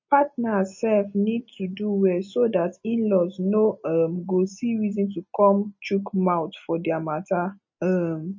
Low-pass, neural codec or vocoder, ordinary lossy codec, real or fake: 7.2 kHz; none; MP3, 32 kbps; real